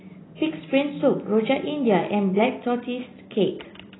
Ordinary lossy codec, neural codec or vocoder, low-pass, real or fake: AAC, 16 kbps; none; 7.2 kHz; real